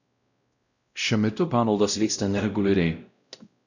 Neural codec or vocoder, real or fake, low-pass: codec, 16 kHz, 0.5 kbps, X-Codec, WavLM features, trained on Multilingual LibriSpeech; fake; 7.2 kHz